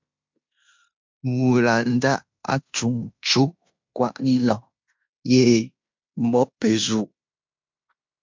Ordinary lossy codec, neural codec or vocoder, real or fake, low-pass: MP3, 64 kbps; codec, 16 kHz in and 24 kHz out, 0.9 kbps, LongCat-Audio-Codec, fine tuned four codebook decoder; fake; 7.2 kHz